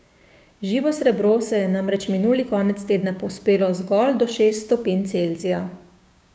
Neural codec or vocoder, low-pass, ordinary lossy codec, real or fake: codec, 16 kHz, 6 kbps, DAC; none; none; fake